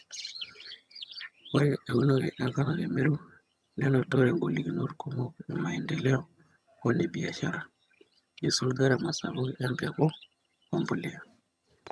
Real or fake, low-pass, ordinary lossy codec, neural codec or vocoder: fake; none; none; vocoder, 22.05 kHz, 80 mel bands, HiFi-GAN